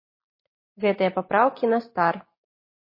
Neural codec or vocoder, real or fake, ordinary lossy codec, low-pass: none; real; MP3, 24 kbps; 5.4 kHz